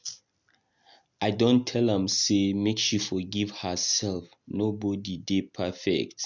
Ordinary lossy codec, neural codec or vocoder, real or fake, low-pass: none; none; real; 7.2 kHz